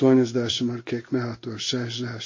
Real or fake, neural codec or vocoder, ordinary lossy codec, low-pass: fake; codec, 16 kHz in and 24 kHz out, 1 kbps, XY-Tokenizer; MP3, 32 kbps; 7.2 kHz